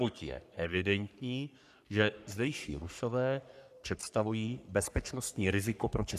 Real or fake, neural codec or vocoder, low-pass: fake; codec, 44.1 kHz, 3.4 kbps, Pupu-Codec; 14.4 kHz